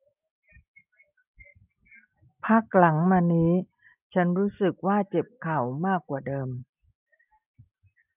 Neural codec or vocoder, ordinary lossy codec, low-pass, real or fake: none; none; 3.6 kHz; real